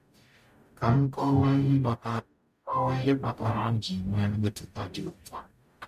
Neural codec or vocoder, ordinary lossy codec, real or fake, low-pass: codec, 44.1 kHz, 0.9 kbps, DAC; MP3, 64 kbps; fake; 14.4 kHz